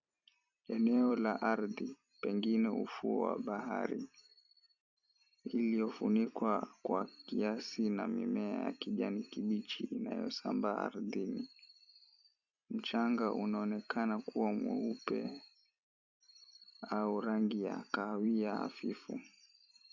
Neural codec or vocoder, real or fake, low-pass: vocoder, 44.1 kHz, 128 mel bands every 256 samples, BigVGAN v2; fake; 7.2 kHz